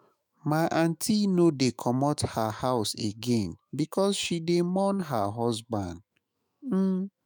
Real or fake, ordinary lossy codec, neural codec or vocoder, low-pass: fake; none; autoencoder, 48 kHz, 128 numbers a frame, DAC-VAE, trained on Japanese speech; none